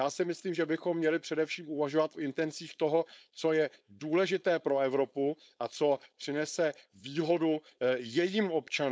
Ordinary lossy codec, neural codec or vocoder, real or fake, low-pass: none; codec, 16 kHz, 4.8 kbps, FACodec; fake; none